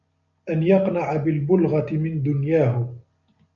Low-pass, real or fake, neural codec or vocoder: 7.2 kHz; real; none